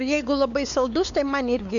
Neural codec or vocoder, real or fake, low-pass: codec, 16 kHz, 4 kbps, X-Codec, WavLM features, trained on Multilingual LibriSpeech; fake; 7.2 kHz